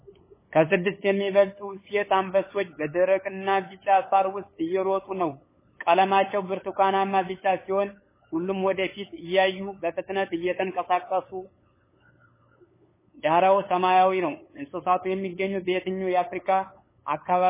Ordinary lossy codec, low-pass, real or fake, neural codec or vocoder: MP3, 16 kbps; 3.6 kHz; fake; codec, 16 kHz, 8 kbps, FunCodec, trained on LibriTTS, 25 frames a second